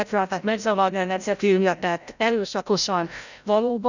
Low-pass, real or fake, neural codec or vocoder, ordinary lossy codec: 7.2 kHz; fake; codec, 16 kHz, 0.5 kbps, FreqCodec, larger model; none